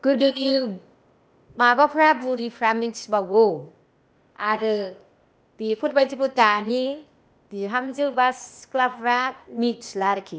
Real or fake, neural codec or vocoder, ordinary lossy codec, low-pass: fake; codec, 16 kHz, 0.8 kbps, ZipCodec; none; none